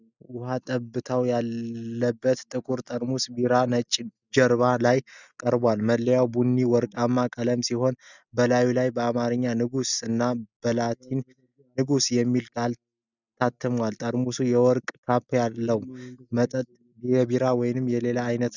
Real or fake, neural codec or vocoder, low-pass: real; none; 7.2 kHz